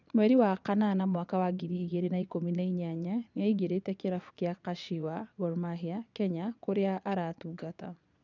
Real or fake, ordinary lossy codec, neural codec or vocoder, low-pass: fake; none; vocoder, 22.05 kHz, 80 mel bands, Vocos; 7.2 kHz